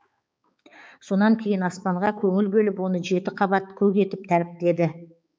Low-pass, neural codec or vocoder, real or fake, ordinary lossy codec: none; codec, 16 kHz, 4 kbps, X-Codec, HuBERT features, trained on balanced general audio; fake; none